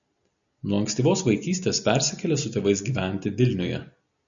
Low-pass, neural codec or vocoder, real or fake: 7.2 kHz; none; real